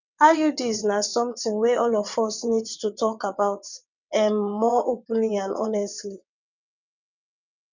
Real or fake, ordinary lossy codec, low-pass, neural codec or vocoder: fake; none; 7.2 kHz; vocoder, 22.05 kHz, 80 mel bands, WaveNeXt